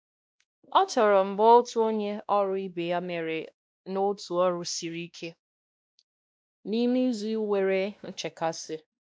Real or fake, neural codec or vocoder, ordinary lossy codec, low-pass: fake; codec, 16 kHz, 1 kbps, X-Codec, WavLM features, trained on Multilingual LibriSpeech; none; none